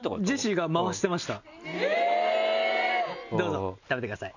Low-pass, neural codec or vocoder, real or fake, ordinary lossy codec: 7.2 kHz; none; real; none